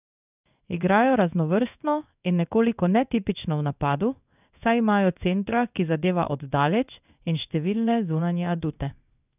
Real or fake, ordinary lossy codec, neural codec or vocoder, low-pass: fake; none; codec, 16 kHz in and 24 kHz out, 1 kbps, XY-Tokenizer; 3.6 kHz